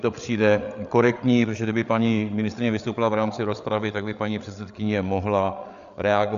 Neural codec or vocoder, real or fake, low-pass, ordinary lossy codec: codec, 16 kHz, 8 kbps, FreqCodec, larger model; fake; 7.2 kHz; AAC, 96 kbps